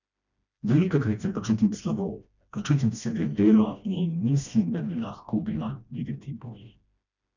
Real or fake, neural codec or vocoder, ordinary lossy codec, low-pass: fake; codec, 16 kHz, 1 kbps, FreqCodec, smaller model; none; 7.2 kHz